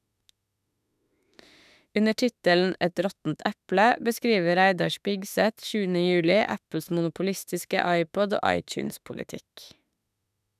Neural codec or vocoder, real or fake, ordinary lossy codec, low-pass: autoencoder, 48 kHz, 32 numbers a frame, DAC-VAE, trained on Japanese speech; fake; none; 14.4 kHz